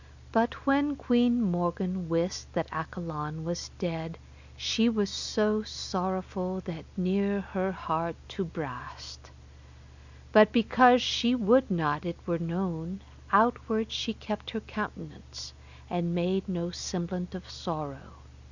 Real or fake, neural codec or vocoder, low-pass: real; none; 7.2 kHz